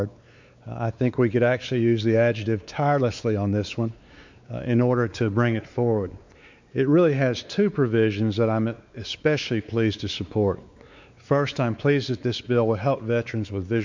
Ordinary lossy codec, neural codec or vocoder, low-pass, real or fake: MP3, 64 kbps; codec, 16 kHz, 4 kbps, X-Codec, WavLM features, trained on Multilingual LibriSpeech; 7.2 kHz; fake